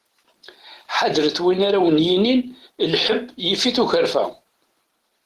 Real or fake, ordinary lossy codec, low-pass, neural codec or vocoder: real; Opus, 16 kbps; 14.4 kHz; none